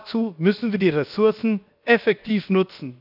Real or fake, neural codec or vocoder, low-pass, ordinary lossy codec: fake; codec, 16 kHz, 0.7 kbps, FocalCodec; 5.4 kHz; none